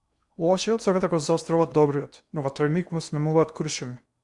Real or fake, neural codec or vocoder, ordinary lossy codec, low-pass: fake; codec, 16 kHz in and 24 kHz out, 0.8 kbps, FocalCodec, streaming, 65536 codes; Opus, 64 kbps; 10.8 kHz